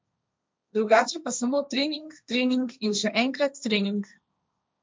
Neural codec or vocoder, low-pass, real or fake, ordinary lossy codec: codec, 16 kHz, 1.1 kbps, Voila-Tokenizer; none; fake; none